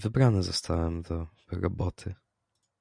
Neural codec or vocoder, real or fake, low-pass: none; real; 9.9 kHz